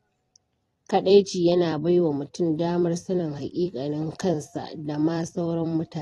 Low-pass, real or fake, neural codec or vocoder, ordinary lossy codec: 19.8 kHz; fake; vocoder, 44.1 kHz, 128 mel bands every 256 samples, BigVGAN v2; AAC, 32 kbps